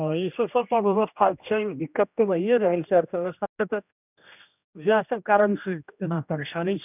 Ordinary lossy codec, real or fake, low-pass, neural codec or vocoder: none; fake; 3.6 kHz; codec, 16 kHz, 1 kbps, X-Codec, HuBERT features, trained on general audio